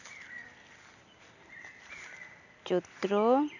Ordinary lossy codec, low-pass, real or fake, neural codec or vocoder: none; 7.2 kHz; real; none